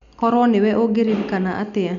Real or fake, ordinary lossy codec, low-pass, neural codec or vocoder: real; none; 7.2 kHz; none